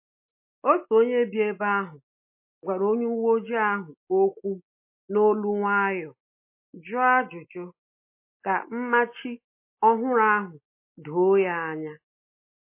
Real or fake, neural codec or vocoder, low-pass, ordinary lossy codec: real; none; 3.6 kHz; MP3, 24 kbps